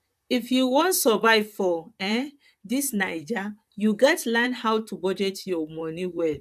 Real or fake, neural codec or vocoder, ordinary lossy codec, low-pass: fake; vocoder, 44.1 kHz, 128 mel bands, Pupu-Vocoder; none; 14.4 kHz